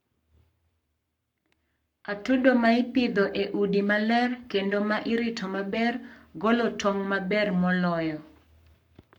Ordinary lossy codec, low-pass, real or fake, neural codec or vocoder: none; 19.8 kHz; fake; codec, 44.1 kHz, 7.8 kbps, Pupu-Codec